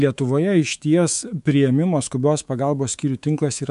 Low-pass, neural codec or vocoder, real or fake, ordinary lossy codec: 10.8 kHz; codec, 24 kHz, 3.1 kbps, DualCodec; fake; MP3, 64 kbps